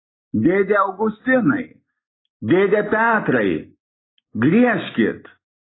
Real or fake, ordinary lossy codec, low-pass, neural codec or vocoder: real; AAC, 16 kbps; 7.2 kHz; none